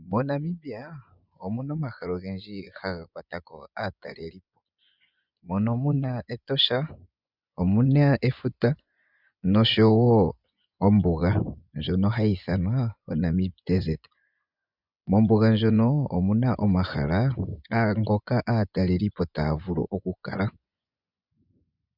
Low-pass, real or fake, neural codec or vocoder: 5.4 kHz; fake; vocoder, 24 kHz, 100 mel bands, Vocos